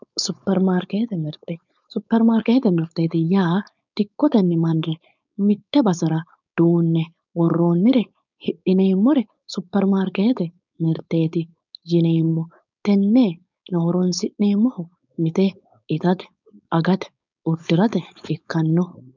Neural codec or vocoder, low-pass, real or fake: codec, 16 kHz, 4.8 kbps, FACodec; 7.2 kHz; fake